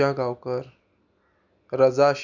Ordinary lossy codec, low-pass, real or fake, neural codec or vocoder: none; 7.2 kHz; real; none